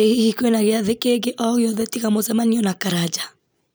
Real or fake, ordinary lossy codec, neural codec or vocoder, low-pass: real; none; none; none